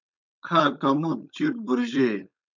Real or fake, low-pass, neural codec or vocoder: fake; 7.2 kHz; codec, 16 kHz, 4.8 kbps, FACodec